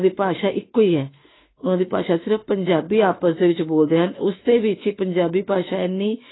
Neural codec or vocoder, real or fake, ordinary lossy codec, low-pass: autoencoder, 48 kHz, 32 numbers a frame, DAC-VAE, trained on Japanese speech; fake; AAC, 16 kbps; 7.2 kHz